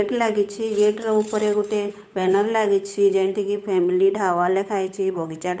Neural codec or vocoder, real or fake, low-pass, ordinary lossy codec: codec, 16 kHz, 8 kbps, FunCodec, trained on Chinese and English, 25 frames a second; fake; none; none